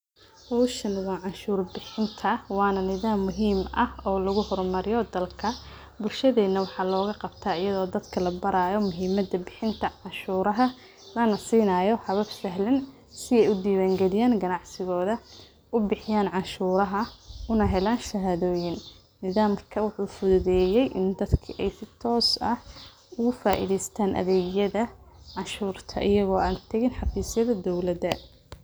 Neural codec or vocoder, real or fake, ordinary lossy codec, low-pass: none; real; none; none